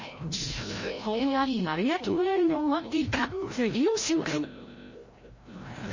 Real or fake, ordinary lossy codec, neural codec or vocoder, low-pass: fake; MP3, 32 kbps; codec, 16 kHz, 0.5 kbps, FreqCodec, larger model; 7.2 kHz